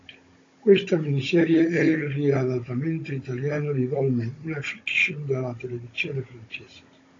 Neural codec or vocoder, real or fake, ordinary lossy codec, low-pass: codec, 16 kHz, 16 kbps, FunCodec, trained on Chinese and English, 50 frames a second; fake; AAC, 32 kbps; 7.2 kHz